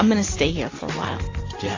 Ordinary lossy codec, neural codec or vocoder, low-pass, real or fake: AAC, 32 kbps; none; 7.2 kHz; real